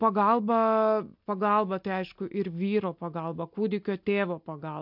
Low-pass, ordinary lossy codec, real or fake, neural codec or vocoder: 5.4 kHz; MP3, 48 kbps; real; none